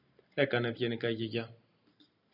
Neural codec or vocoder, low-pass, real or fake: none; 5.4 kHz; real